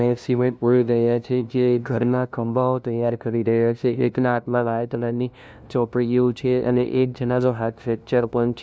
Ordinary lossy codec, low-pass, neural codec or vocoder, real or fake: none; none; codec, 16 kHz, 0.5 kbps, FunCodec, trained on LibriTTS, 25 frames a second; fake